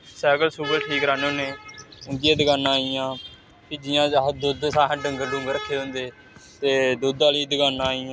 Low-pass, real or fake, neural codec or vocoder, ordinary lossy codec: none; real; none; none